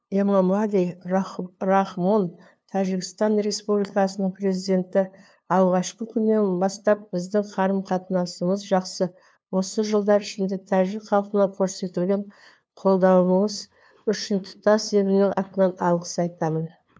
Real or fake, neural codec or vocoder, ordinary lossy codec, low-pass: fake; codec, 16 kHz, 2 kbps, FunCodec, trained on LibriTTS, 25 frames a second; none; none